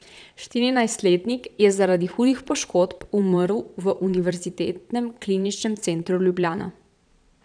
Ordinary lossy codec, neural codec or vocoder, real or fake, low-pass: none; vocoder, 22.05 kHz, 80 mel bands, Vocos; fake; 9.9 kHz